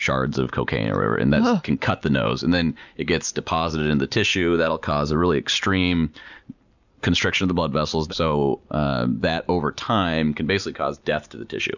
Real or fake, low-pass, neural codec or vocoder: real; 7.2 kHz; none